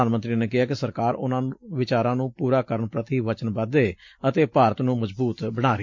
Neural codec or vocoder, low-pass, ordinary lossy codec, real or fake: none; 7.2 kHz; MP3, 48 kbps; real